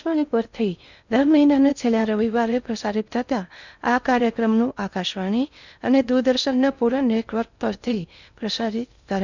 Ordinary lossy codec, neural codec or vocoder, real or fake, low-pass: none; codec, 16 kHz in and 24 kHz out, 0.6 kbps, FocalCodec, streaming, 4096 codes; fake; 7.2 kHz